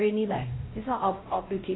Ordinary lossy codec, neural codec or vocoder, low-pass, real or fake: AAC, 16 kbps; codec, 16 kHz, 0.5 kbps, X-Codec, WavLM features, trained on Multilingual LibriSpeech; 7.2 kHz; fake